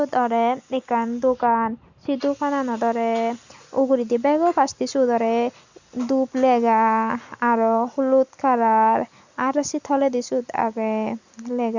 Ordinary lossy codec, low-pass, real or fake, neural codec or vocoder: Opus, 64 kbps; 7.2 kHz; real; none